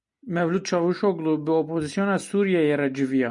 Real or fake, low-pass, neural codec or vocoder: real; 10.8 kHz; none